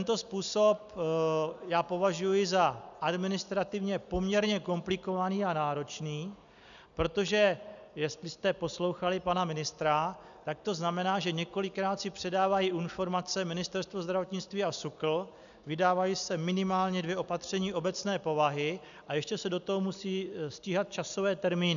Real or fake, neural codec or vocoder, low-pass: real; none; 7.2 kHz